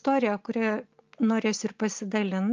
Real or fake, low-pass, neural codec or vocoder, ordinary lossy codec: real; 7.2 kHz; none; Opus, 24 kbps